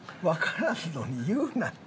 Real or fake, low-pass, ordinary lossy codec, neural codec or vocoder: real; none; none; none